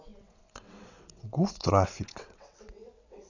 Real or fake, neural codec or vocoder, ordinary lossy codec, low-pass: fake; vocoder, 22.05 kHz, 80 mel bands, WaveNeXt; none; 7.2 kHz